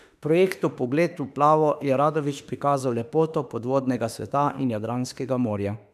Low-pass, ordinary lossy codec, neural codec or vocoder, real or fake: 14.4 kHz; none; autoencoder, 48 kHz, 32 numbers a frame, DAC-VAE, trained on Japanese speech; fake